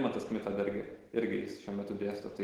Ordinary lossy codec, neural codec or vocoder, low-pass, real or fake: Opus, 16 kbps; none; 19.8 kHz; real